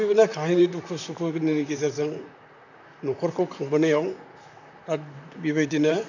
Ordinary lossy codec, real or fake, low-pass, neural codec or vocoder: none; fake; 7.2 kHz; vocoder, 44.1 kHz, 128 mel bands, Pupu-Vocoder